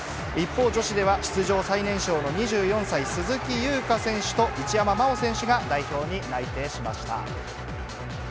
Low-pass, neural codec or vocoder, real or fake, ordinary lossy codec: none; none; real; none